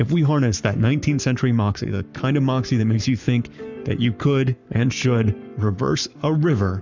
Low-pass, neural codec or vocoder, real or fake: 7.2 kHz; codec, 44.1 kHz, 7.8 kbps, Pupu-Codec; fake